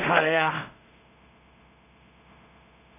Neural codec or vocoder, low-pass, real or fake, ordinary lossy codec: codec, 16 kHz in and 24 kHz out, 0.4 kbps, LongCat-Audio-Codec, two codebook decoder; 3.6 kHz; fake; none